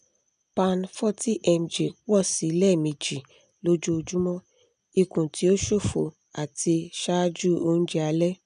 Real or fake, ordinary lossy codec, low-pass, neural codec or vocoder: real; none; 9.9 kHz; none